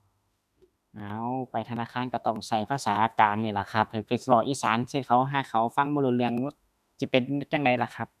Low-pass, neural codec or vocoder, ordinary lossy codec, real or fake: 14.4 kHz; autoencoder, 48 kHz, 32 numbers a frame, DAC-VAE, trained on Japanese speech; none; fake